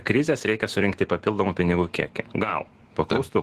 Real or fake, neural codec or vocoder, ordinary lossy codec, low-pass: fake; autoencoder, 48 kHz, 128 numbers a frame, DAC-VAE, trained on Japanese speech; Opus, 16 kbps; 14.4 kHz